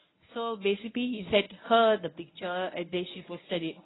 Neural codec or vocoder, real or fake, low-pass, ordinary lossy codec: codec, 24 kHz, 0.9 kbps, WavTokenizer, medium speech release version 1; fake; 7.2 kHz; AAC, 16 kbps